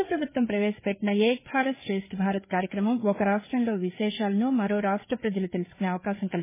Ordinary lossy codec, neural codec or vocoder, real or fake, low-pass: MP3, 16 kbps; codec, 16 kHz, 4 kbps, FreqCodec, larger model; fake; 3.6 kHz